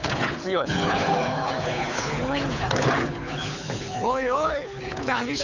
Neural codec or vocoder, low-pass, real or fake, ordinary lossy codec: codec, 24 kHz, 6 kbps, HILCodec; 7.2 kHz; fake; none